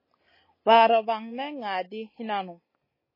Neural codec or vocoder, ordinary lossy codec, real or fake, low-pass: none; MP3, 24 kbps; real; 5.4 kHz